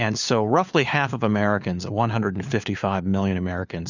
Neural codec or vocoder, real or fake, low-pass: codec, 16 kHz, 4 kbps, FunCodec, trained on LibriTTS, 50 frames a second; fake; 7.2 kHz